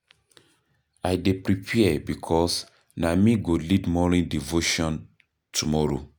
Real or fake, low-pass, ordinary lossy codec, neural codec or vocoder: real; none; none; none